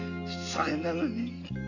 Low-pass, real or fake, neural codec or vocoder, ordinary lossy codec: 7.2 kHz; real; none; none